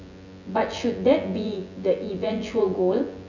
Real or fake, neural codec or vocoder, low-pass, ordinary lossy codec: fake; vocoder, 24 kHz, 100 mel bands, Vocos; 7.2 kHz; none